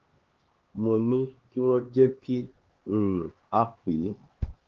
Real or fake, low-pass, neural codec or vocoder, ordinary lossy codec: fake; 7.2 kHz; codec, 16 kHz, 2 kbps, X-Codec, HuBERT features, trained on LibriSpeech; Opus, 16 kbps